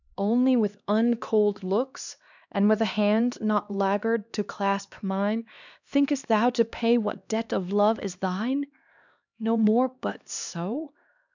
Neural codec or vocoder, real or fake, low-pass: codec, 16 kHz, 2 kbps, X-Codec, HuBERT features, trained on LibriSpeech; fake; 7.2 kHz